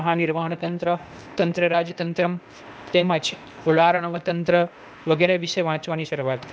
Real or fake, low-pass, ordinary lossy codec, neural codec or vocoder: fake; none; none; codec, 16 kHz, 0.8 kbps, ZipCodec